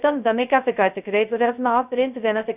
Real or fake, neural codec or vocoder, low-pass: fake; codec, 16 kHz, 0.2 kbps, FocalCodec; 3.6 kHz